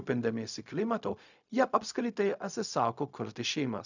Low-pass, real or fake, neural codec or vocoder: 7.2 kHz; fake; codec, 16 kHz, 0.4 kbps, LongCat-Audio-Codec